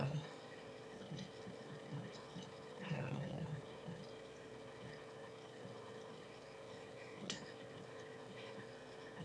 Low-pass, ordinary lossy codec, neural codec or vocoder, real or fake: none; none; autoencoder, 22.05 kHz, a latent of 192 numbers a frame, VITS, trained on one speaker; fake